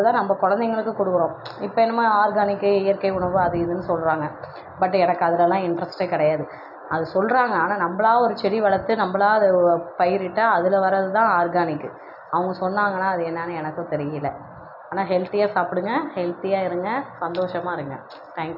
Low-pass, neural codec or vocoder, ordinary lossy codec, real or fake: 5.4 kHz; none; none; real